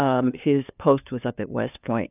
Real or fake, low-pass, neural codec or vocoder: fake; 3.6 kHz; codec, 16 kHz, 2 kbps, FunCodec, trained on LibriTTS, 25 frames a second